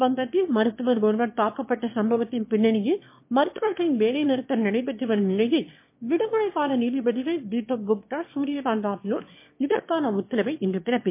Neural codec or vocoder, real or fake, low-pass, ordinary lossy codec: autoencoder, 22.05 kHz, a latent of 192 numbers a frame, VITS, trained on one speaker; fake; 3.6 kHz; MP3, 24 kbps